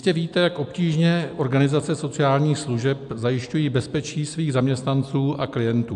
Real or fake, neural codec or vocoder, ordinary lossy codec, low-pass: real; none; AAC, 96 kbps; 10.8 kHz